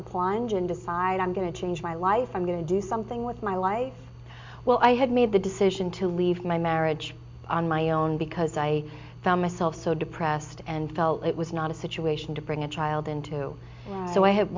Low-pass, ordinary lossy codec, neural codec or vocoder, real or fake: 7.2 kHz; MP3, 64 kbps; none; real